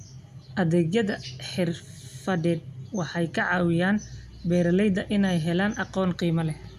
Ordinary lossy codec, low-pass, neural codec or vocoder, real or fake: none; 14.4 kHz; none; real